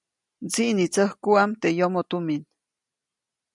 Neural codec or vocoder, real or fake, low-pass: none; real; 10.8 kHz